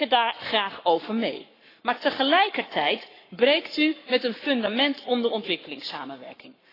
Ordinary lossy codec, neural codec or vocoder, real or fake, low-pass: AAC, 24 kbps; codec, 44.1 kHz, 7.8 kbps, Pupu-Codec; fake; 5.4 kHz